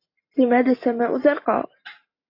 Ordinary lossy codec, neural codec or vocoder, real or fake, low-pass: AAC, 32 kbps; none; real; 5.4 kHz